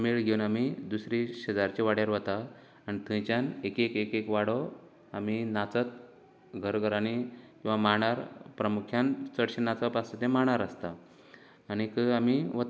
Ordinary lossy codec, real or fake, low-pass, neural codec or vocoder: none; real; none; none